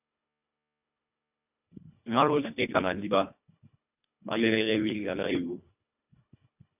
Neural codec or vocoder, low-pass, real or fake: codec, 24 kHz, 1.5 kbps, HILCodec; 3.6 kHz; fake